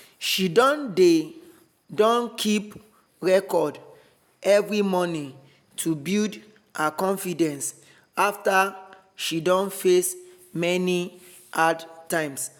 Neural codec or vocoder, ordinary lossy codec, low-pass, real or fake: none; none; none; real